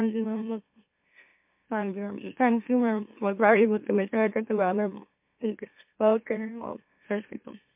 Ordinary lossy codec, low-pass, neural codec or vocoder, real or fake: MP3, 32 kbps; 3.6 kHz; autoencoder, 44.1 kHz, a latent of 192 numbers a frame, MeloTTS; fake